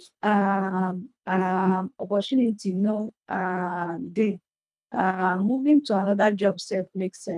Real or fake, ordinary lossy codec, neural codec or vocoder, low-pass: fake; none; codec, 24 kHz, 1.5 kbps, HILCodec; none